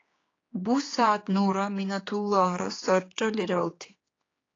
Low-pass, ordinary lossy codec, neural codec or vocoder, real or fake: 7.2 kHz; AAC, 32 kbps; codec, 16 kHz, 4 kbps, X-Codec, HuBERT features, trained on general audio; fake